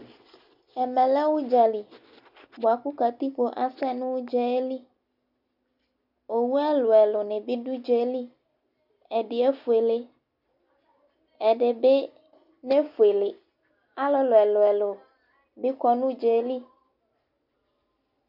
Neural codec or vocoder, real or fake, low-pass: none; real; 5.4 kHz